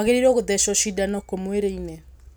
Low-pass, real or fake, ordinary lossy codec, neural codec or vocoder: none; real; none; none